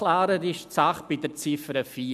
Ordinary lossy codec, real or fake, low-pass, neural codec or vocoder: none; real; 14.4 kHz; none